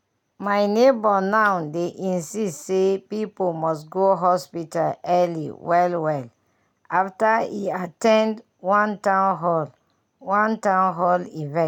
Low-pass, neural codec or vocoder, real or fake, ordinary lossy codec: 19.8 kHz; none; real; none